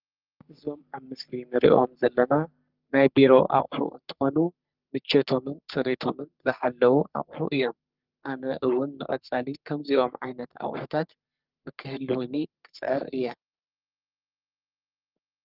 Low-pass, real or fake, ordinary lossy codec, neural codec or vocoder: 5.4 kHz; fake; Opus, 24 kbps; codec, 44.1 kHz, 3.4 kbps, Pupu-Codec